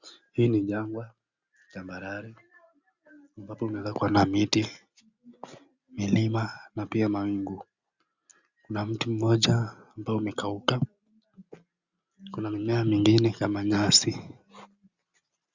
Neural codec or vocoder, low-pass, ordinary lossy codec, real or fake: none; 7.2 kHz; Opus, 64 kbps; real